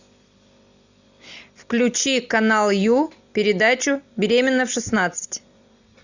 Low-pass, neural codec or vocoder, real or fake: 7.2 kHz; none; real